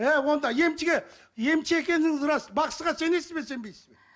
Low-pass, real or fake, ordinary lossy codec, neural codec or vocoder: none; real; none; none